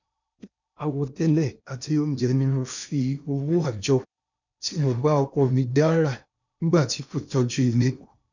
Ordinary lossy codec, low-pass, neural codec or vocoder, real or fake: none; 7.2 kHz; codec, 16 kHz in and 24 kHz out, 0.8 kbps, FocalCodec, streaming, 65536 codes; fake